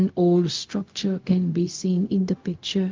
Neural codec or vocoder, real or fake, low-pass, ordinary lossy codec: codec, 16 kHz, 0.4 kbps, LongCat-Audio-Codec; fake; 7.2 kHz; Opus, 32 kbps